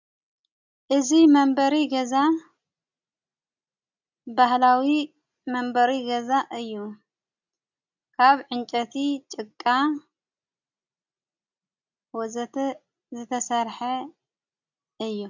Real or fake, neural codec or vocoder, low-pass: real; none; 7.2 kHz